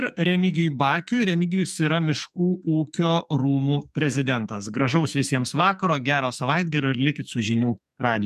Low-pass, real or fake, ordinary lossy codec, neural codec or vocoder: 14.4 kHz; fake; MP3, 96 kbps; codec, 44.1 kHz, 2.6 kbps, SNAC